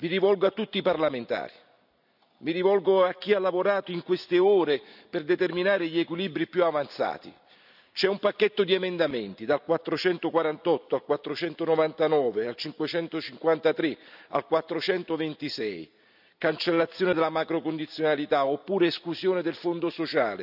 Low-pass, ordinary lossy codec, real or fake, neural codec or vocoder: 5.4 kHz; none; real; none